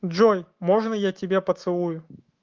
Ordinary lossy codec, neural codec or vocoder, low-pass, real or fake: Opus, 32 kbps; autoencoder, 48 kHz, 128 numbers a frame, DAC-VAE, trained on Japanese speech; 7.2 kHz; fake